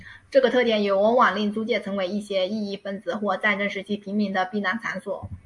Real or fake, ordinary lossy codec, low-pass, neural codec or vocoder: real; AAC, 64 kbps; 10.8 kHz; none